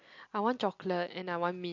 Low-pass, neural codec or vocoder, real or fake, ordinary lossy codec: 7.2 kHz; none; real; MP3, 48 kbps